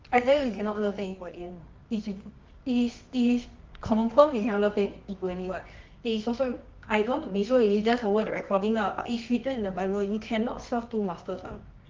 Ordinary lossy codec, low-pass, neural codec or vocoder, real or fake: Opus, 32 kbps; 7.2 kHz; codec, 24 kHz, 0.9 kbps, WavTokenizer, medium music audio release; fake